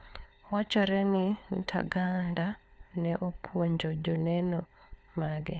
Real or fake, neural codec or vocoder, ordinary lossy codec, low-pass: fake; codec, 16 kHz, 4 kbps, FunCodec, trained on LibriTTS, 50 frames a second; none; none